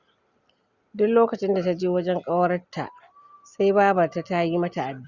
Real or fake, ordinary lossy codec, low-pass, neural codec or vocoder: real; Opus, 64 kbps; 7.2 kHz; none